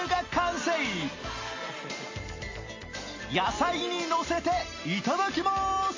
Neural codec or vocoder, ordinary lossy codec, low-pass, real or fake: none; MP3, 32 kbps; 7.2 kHz; real